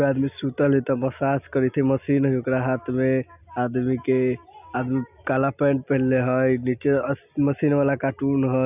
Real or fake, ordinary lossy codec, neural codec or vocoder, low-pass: real; none; none; 3.6 kHz